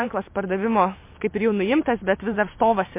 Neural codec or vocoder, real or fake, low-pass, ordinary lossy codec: vocoder, 44.1 kHz, 128 mel bands every 512 samples, BigVGAN v2; fake; 3.6 kHz; MP3, 24 kbps